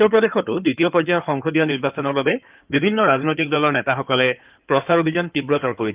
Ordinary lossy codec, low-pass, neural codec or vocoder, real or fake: Opus, 32 kbps; 3.6 kHz; codec, 16 kHz in and 24 kHz out, 2.2 kbps, FireRedTTS-2 codec; fake